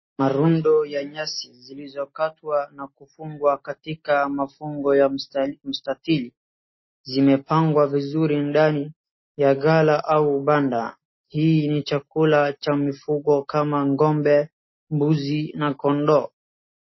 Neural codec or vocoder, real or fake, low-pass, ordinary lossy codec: none; real; 7.2 kHz; MP3, 24 kbps